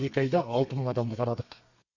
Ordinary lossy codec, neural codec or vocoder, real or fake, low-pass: Opus, 64 kbps; codec, 32 kHz, 1.9 kbps, SNAC; fake; 7.2 kHz